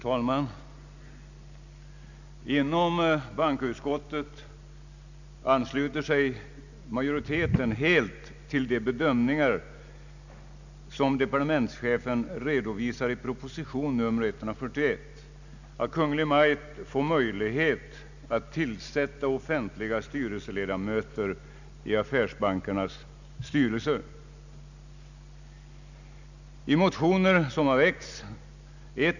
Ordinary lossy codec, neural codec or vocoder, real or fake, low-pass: none; none; real; 7.2 kHz